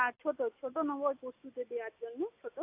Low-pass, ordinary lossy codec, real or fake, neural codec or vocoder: 3.6 kHz; AAC, 32 kbps; real; none